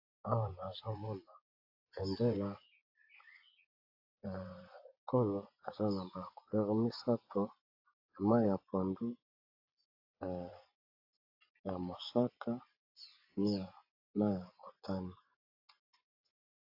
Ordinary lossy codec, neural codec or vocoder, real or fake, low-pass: AAC, 48 kbps; vocoder, 24 kHz, 100 mel bands, Vocos; fake; 5.4 kHz